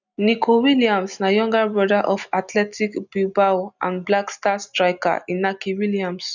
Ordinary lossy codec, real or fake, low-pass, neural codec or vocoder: none; real; 7.2 kHz; none